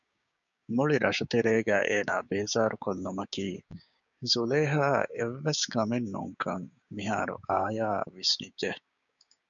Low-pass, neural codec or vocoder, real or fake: 7.2 kHz; codec, 16 kHz, 6 kbps, DAC; fake